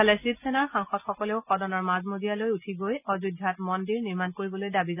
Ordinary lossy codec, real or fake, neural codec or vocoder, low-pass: none; real; none; 3.6 kHz